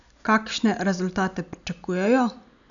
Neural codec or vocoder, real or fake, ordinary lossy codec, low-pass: none; real; none; 7.2 kHz